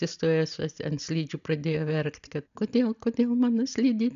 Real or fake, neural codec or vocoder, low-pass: real; none; 7.2 kHz